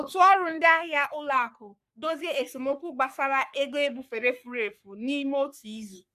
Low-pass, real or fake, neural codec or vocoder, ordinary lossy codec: 14.4 kHz; fake; codec, 44.1 kHz, 3.4 kbps, Pupu-Codec; none